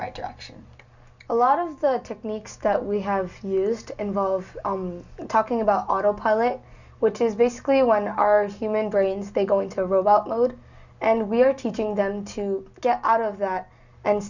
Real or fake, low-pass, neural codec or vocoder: real; 7.2 kHz; none